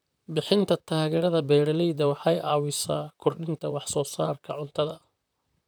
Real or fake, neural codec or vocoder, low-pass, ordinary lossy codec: fake; vocoder, 44.1 kHz, 128 mel bands, Pupu-Vocoder; none; none